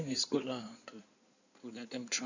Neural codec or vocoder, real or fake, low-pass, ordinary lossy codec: codec, 16 kHz in and 24 kHz out, 2.2 kbps, FireRedTTS-2 codec; fake; 7.2 kHz; none